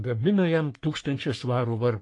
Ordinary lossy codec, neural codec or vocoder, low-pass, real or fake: AAC, 48 kbps; codec, 44.1 kHz, 3.4 kbps, Pupu-Codec; 10.8 kHz; fake